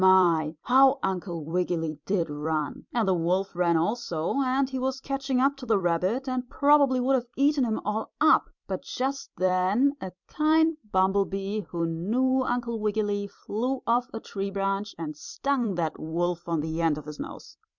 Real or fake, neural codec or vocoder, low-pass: fake; vocoder, 44.1 kHz, 128 mel bands every 256 samples, BigVGAN v2; 7.2 kHz